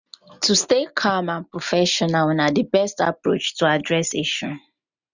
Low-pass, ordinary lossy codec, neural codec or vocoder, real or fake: 7.2 kHz; none; none; real